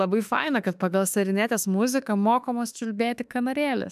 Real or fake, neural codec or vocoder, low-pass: fake; autoencoder, 48 kHz, 32 numbers a frame, DAC-VAE, trained on Japanese speech; 14.4 kHz